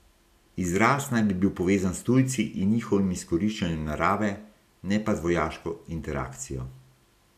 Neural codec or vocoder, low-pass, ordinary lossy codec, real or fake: none; 14.4 kHz; none; real